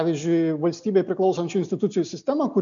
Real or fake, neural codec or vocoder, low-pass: real; none; 7.2 kHz